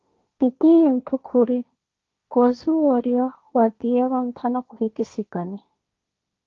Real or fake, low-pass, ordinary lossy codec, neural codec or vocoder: fake; 7.2 kHz; Opus, 32 kbps; codec, 16 kHz, 1.1 kbps, Voila-Tokenizer